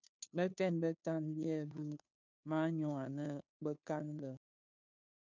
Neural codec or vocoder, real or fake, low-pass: codec, 16 kHz, 2 kbps, FunCodec, trained on Chinese and English, 25 frames a second; fake; 7.2 kHz